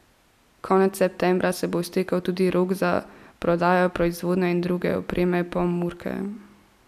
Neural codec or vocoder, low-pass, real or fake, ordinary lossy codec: none; 14.4 kHz; real; none